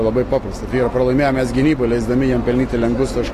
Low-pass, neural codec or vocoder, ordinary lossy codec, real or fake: 14.4 kHz; none; AAC, 48 kbps; real